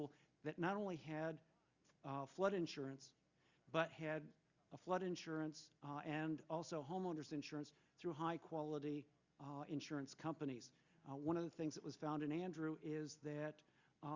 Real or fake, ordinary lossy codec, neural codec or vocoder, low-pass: real; Opus, 64 kbps; none; 7.2 kHz